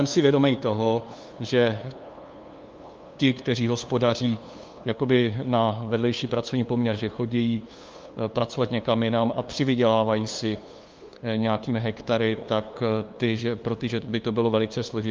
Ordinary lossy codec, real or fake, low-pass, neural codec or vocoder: Opus, 24 kbps; fake; 7.2 kHz; codec, 16 kHz, 2 kbps, FunCodec, trained on LibriTTS, 25 frames a second